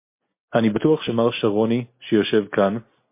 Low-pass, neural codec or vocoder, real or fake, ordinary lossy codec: 3.6 kHz; none; real; MP3, 24 kbps